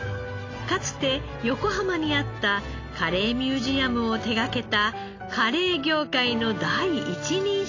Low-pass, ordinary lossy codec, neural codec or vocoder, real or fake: 7.2 kHz; AAC, 32 kbps; none; real